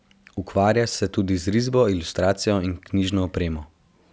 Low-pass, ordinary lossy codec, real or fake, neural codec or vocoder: none; none; real; none